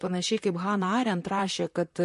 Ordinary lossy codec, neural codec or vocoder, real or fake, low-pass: MP3, 48 kbps; vocoder, 44.1 kHz, 128 mel bands, Pupu-Vocoder; fake; 14.4 kHz